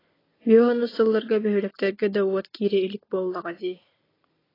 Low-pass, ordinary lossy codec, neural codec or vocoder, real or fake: 5.4 kHz; AAC, 24 kbps; none; real